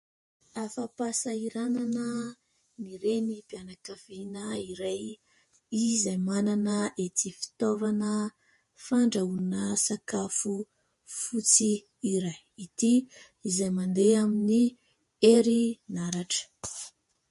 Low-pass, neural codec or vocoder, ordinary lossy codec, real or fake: 14.4 kHz; vocoder, 48 kHz, 128 mel bands, Vocos; MP3, 48 kbps; fake